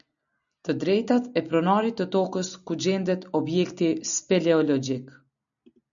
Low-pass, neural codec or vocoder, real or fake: 7.2 kHz; none; real